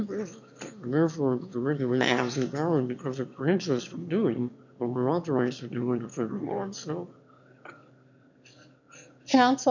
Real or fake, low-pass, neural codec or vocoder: fake; 7.2 kHz; autoencoder, 22.05 kHz, a latent of 192 numbers a frame, VITS, trained on one speaker